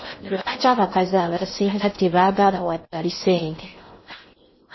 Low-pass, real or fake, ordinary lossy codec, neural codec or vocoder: 7.2 kHz; fake; MP3, 24 kbps; codec, 16 kHz in and 24 kHz out, 0.6 kbps, FocalCodec, streaming, 4096 codes